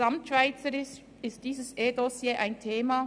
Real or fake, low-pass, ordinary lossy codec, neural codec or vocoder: real; 9.9 kHz; none; none